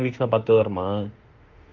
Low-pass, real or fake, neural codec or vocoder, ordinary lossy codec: 7.2 kHz; fake; autoencoder, 48 kHz, 32 numbers a frame, DAC-VAE, trained on Japanese speech; Opus, 32 kbps